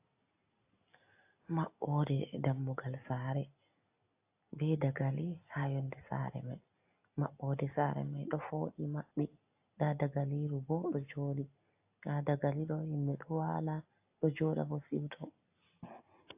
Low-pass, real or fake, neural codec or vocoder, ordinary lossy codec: 3.6 kHz; real; none; AAC, 32 kbps